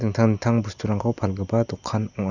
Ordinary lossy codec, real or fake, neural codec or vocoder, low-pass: none; real; none; 7.2 kHz